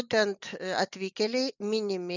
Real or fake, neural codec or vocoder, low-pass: real; none; 7.2 kHz